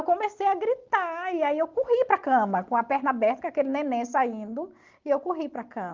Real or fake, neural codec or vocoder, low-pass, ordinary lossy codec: real; none; 7.2 kHz; Opus, 32 kbps